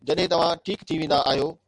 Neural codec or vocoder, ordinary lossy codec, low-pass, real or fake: none; MP3, 96 kbps; 10.8 kHz; real